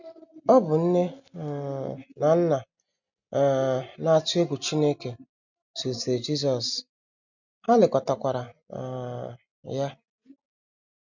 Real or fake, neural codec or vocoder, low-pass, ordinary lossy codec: real; none; 7.2 kHz; none